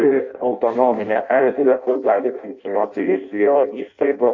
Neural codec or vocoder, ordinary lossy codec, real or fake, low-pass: codec, 16 kHz in and 24 kHz out, 0.6 kbps, FireRedTTS-2 codec; AAC, 48 kbps; fake; 7.2 kHz